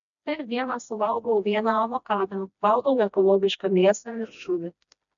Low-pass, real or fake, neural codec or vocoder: 7.2 kHz; fake; codec, 16 kHz, 1 kbps, FreqCodec, smaller model